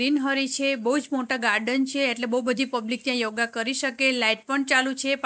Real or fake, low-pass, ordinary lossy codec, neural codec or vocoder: real; none; none; none